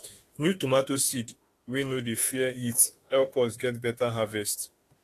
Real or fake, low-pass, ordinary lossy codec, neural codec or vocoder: fake; 14.4 kHz; AAC, 48 kbps; autoencoder, 48 kHz, 32 numbers a frame, DAC-VAE, trained on Japanese speech